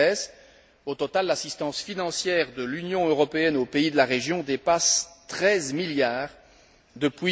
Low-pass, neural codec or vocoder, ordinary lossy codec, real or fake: none; none; none; real